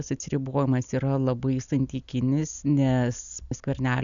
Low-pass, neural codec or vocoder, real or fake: 7.2 kHz; none; real